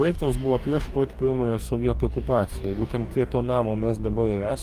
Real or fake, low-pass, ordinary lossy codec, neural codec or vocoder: fake; 14.4 kHz; Opus, 32 kbps; codec, 44.1 kHz, 2.6 kbps, DAC